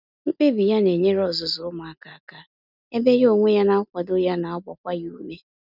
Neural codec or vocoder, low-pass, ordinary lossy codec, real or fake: none; 5.4 kHz; none; real